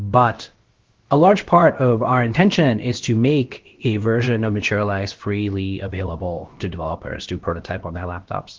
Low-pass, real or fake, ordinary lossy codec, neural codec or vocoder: 7.2 kHz; fake; Opus, 16 kbps; codec, 16 kHz, about 1 kbps, DyCAST, with the encoder's durations